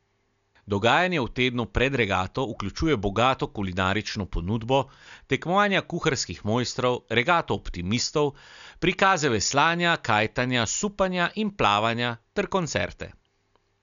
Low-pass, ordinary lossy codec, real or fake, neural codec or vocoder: 7.2 kHz; MP3, 96 kbps; real; none